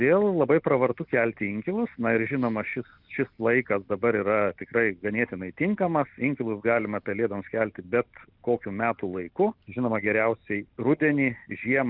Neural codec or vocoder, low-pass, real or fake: none; 5.4 kHz; real